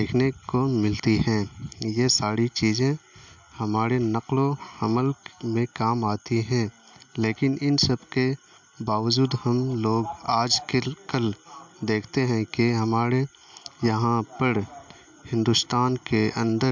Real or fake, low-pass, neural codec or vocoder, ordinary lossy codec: real; 7.2 kHz; none; MP3, 64 kbps